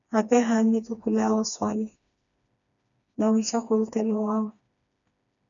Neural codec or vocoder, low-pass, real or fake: codec, 16 kHz, 2 kbps, FreqCodec, smaller model; 7.2 kHz; fake